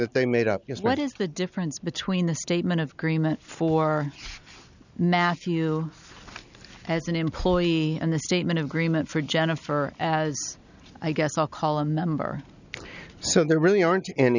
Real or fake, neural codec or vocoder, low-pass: real; none; 7.2 kHz